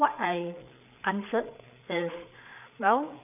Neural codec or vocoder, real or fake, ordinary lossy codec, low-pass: codec, 16 kHz, 8 kbps, FreqCodec, larger model; fake; none; 3.6 kHz